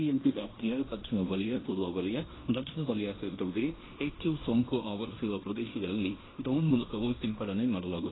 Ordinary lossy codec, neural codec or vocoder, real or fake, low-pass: AAC, 16 kbps; codec, 16 kHz in and 24 kHz out, 0.9 kbps, LongCat-Audio-Codec, fine tuned four codebook decoder; fake; 7.2 kHz